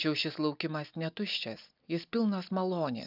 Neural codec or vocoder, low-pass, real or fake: none; 5.4 kHz; real